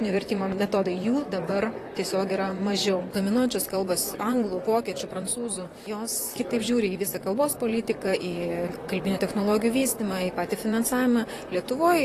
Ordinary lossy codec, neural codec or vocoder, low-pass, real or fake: AAC, 48 kbps; vocoder, 44.1 kHz, 128 mel bands, Pupu-Vocoder; 14.4 kHz; fake